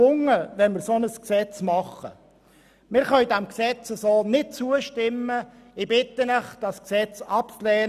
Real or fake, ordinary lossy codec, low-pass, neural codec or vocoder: real; none; 14.4 kHz; none